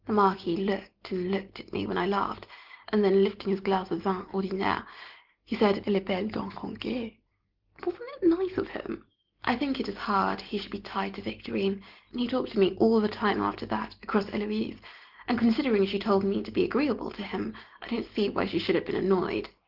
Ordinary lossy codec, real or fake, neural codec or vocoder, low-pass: Opus, 16 kbps; real; none; 5.4 kHz